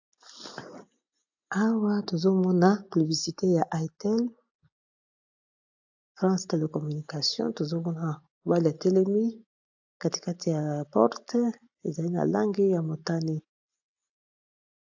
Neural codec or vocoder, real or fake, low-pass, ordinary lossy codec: none; real; 7.2 kHz; AAC, 48 kbps